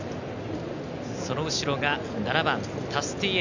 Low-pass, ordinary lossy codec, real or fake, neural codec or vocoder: 7.2 kHz; none; real; none